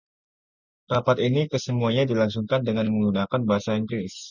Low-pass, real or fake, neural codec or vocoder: 7.2 kHz; real; none